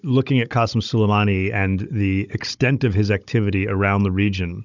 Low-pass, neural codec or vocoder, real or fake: 7.2 kHz; codec, 16 kHz, 16 kbps, FunCodec, trained on Chinese and English, 50 frames a second; fake